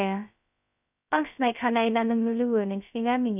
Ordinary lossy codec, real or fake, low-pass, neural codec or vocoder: none; fake; 3.6 kHz; codec, 16 kHz, 0.2 kbps, FocalCodec